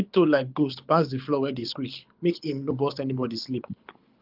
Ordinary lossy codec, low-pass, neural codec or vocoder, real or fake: Opus, 24 kbps; 5.4 kHz; codec, 24 kHz, 6 kbps, HILCodec; fake